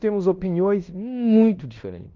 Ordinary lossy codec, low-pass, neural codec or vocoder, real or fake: Opus, 24 kbps; 7.2 kHz; codec, 16 kHz in and 24 kHz out, 0.9 kbps, LongCat-Audio-Codec, fine tuned four codebook decoder; fake